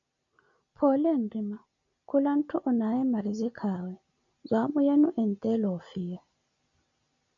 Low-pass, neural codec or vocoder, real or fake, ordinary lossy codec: 7.2 kHz; none; real; MP3, 96 kbps